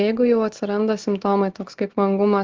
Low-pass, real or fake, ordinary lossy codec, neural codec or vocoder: 7.2 kHz; real; Opus, 16 kbps; none